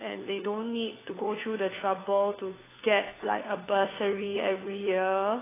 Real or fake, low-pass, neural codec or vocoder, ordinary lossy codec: fake; 3.6 kHz; codec, 16 kHz, 4 kbps, FunCodec, trained on LibriTTS, 50 frames a second; AAC, 16 kbps